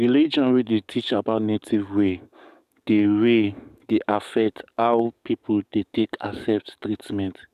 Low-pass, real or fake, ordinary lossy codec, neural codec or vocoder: 14.4 kHz; fake; none; codec, 44.1 kHz, 7.8 kbps, DAC